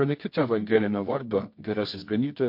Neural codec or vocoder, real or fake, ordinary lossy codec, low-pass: codec, 24 kHz, 0.9 kbps, WavTokenizer, medium music audio release; fake; MP3, 32 kbps; 5.4 kHz